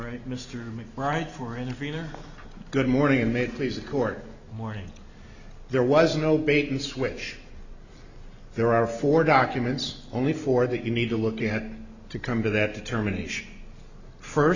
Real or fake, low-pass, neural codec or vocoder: real; 7.2 kHz; none